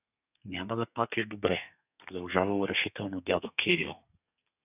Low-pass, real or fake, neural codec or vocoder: 3.6 kHz; fake; codec, 32 kHz, 1.9 kbps, SNAC